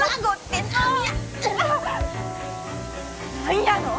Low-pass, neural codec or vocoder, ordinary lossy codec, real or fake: none; none; none; real